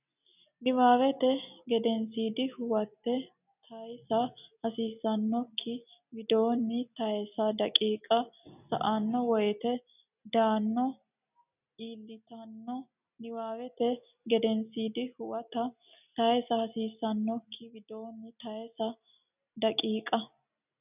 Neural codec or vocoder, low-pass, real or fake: none; 3.6 kHz; real